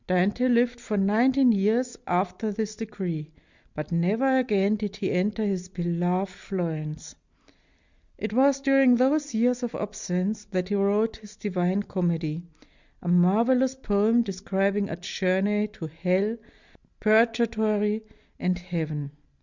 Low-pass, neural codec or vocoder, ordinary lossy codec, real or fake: 7.2 kHz; none; Opus, 64 kbps; real